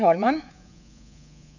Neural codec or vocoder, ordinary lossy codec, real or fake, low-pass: codec, 24 kHz, 3.1 kbps, DualCodec; none; fake; 7.2 kHz